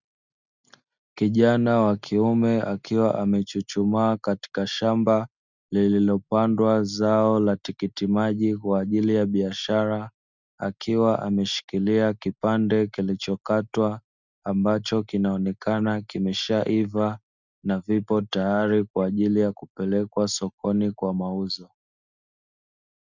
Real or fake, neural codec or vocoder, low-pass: real; none; 7.2 kHz